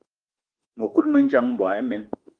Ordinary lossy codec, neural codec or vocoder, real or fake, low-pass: Opus, 24 kbps; autoencoder, 48 kHz, 32 numbers a frame, DAC-VAE, trained on Japanese speech; fake; 9.9 kHz